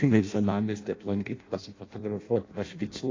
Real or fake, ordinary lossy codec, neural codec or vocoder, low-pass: fake; AAC, 32 kbps; codec, 16 kHz in and 24 kHz out, 0.6 kbps, FireRedTTS-2 codec; 7.2 kHz